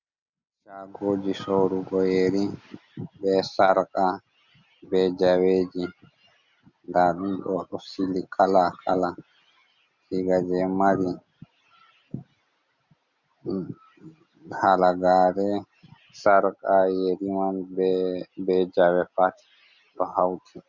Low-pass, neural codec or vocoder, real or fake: 7.2 kHz; none; real